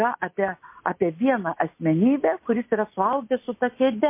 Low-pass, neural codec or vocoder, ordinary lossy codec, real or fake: 3.6 kHz; none; MP3, 24 kbps; real